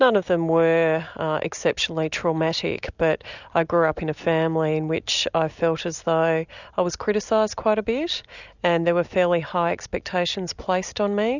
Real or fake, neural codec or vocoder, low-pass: real; none; 7.2 kHz